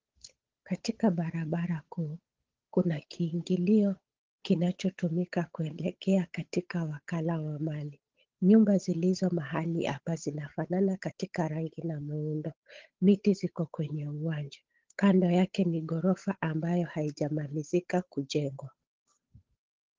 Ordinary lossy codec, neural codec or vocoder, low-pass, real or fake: Opus, 16 kbps; codec, 16 kHz, 8 kbps, FunCodec, trained on Chinese and English, 25 frames a second; 7.2 kHz; fake